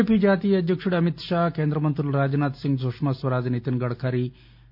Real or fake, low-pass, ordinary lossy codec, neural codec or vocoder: real; 5.4 kHz; none; none